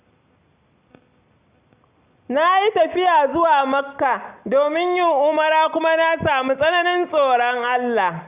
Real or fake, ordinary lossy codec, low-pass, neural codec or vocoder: real; Opus, 64 kbps; 3.6 kHz; none